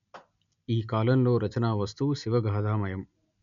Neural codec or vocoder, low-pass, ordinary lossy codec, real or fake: none; 7.2 kHz; none; real